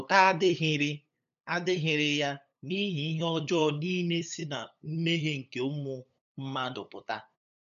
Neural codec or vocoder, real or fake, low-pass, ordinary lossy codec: codec, 16 kHz, 8 kbps, FunCodec, trained on LibriTTS, 25 frames a second; fake; 7.2 kHz; MP3, 96 kbps